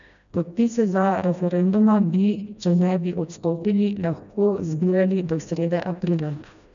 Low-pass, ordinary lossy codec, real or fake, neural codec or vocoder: 7.2 kHz; none; fake; codec, 16 kHz, 1 kbps, FreqCodec, smaller model